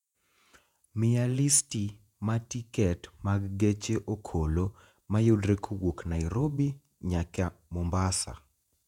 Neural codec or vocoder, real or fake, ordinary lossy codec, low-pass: vocoder, 48 kHz, 128 mel bands, Vocos; fake; none; 19.8 kHz